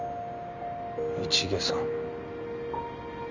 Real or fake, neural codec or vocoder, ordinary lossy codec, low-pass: real; none; none; 7.2 kHz